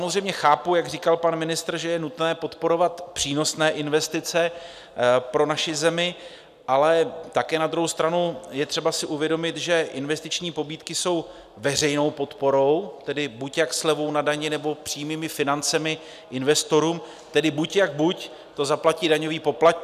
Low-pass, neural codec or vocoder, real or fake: 14.4 kHz; none; real